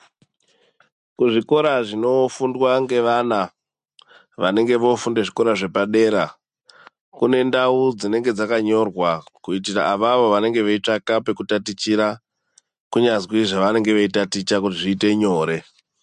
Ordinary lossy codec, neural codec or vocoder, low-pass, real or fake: MP3, 64 kbps; none; 10.8 kHz; real